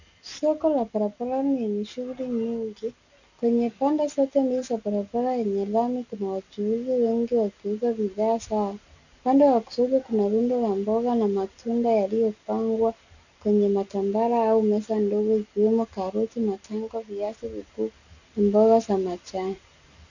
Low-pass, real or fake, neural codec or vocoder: 7.2 kHz; real; none